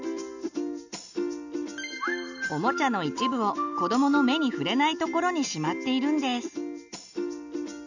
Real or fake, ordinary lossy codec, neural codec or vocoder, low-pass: real; none; none; 7.2 kHz